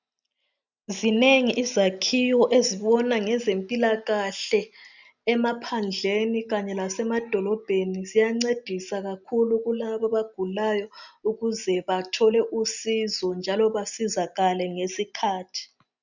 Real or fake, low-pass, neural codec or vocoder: real; 7.2 kHz; none